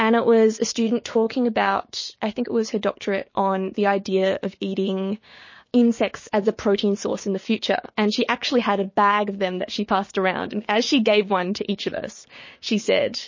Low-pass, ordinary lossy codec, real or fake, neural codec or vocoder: 7.2 kHz; MP3, 32 kbps; fake; codec, 16 kHz, 6 kbps, DAC